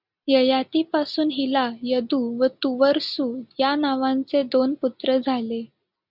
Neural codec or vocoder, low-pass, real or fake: none; 5.4 kHz; real